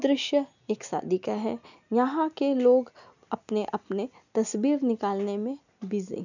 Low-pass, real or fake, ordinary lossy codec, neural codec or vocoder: 7.2 kHz; real; none; none